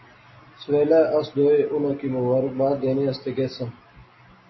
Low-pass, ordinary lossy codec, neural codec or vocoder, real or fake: 7.2 kHz; MP3, 24 kbps; none; real